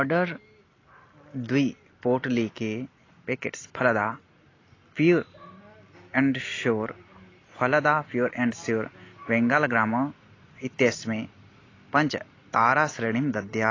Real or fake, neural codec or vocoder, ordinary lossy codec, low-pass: real; none; AAC, 32 kbps; 7.2 kHz